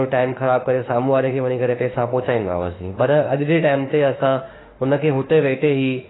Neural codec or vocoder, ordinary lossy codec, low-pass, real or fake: autoencoder, 48 kHz, 32 numbers a frame, DAC-VAE, trained on Japanese speech; AAC, 16 kbps; 7.2 kHz; fake